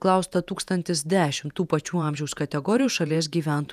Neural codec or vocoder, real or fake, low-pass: vocoder, 48 kHz, 128 mel bands, Vocos; fake; 14.4 kHz